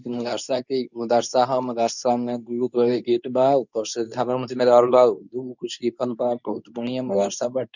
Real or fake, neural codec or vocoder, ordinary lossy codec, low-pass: fake; codec, 24 kHz, 0.9 kbps, WavTokenizer, medium speech release version 2; none; 7.2 kHz